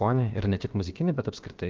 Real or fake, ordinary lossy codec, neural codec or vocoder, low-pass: fake; Opus, 32 kbps; codec, 16 kHz, about 1 kbps, DyCAST, with the encoder's durations; 7.2 kHz